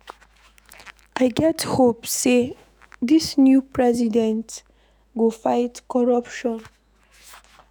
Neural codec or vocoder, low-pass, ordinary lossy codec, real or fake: autoencoder, 48 kHz, 128 numbers a frame, DAC-VAE, trained on Japanese speech; none; none; fake